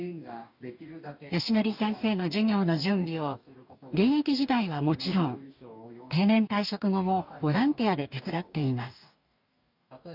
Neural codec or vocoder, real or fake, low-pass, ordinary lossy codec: codec, 44.1 kHz, 2.6 kbps, DAC; fake; 5.4 kHz; none